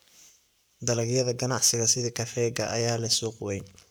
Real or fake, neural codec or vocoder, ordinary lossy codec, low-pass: fake; codec, 44.1 kHz, 7.8 kbps, Pupu-Codec; none; none